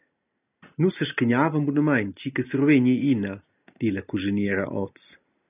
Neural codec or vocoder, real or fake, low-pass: none; real; 3.6 kHz